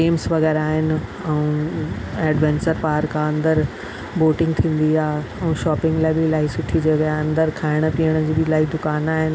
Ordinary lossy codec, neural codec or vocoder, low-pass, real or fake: none; none; none; real